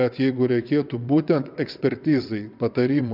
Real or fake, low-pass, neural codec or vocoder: fake; 5.4 kHz; vocoder, 22.05 kHz, 80 mel bands, WaveNeXt